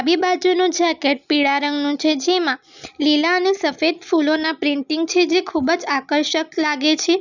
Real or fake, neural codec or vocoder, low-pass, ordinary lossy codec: real; none; 7.2 kHz; none